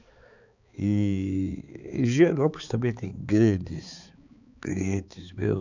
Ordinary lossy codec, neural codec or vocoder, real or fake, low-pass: none; codec, 16 kHz, 4 kbps, X-Codec, HuBERT features, trained on balanced general audio; fake; 7.2 kHz